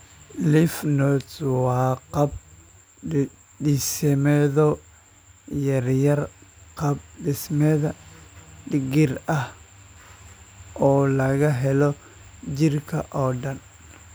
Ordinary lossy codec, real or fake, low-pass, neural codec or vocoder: none; real; none; none